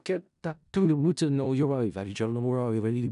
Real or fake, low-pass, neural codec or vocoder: fake; 10.8 kHz; codec, 16 kHz in and 24 kHz out, 0.4 kbps, LongCat-Audio-Codec, four codebook decoder